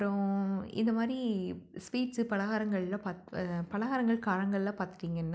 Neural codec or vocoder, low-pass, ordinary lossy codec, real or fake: none; none; none; real